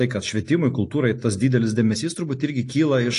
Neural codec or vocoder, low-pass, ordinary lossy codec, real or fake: none; 10.8 kHz; AAC, 48 kbps; real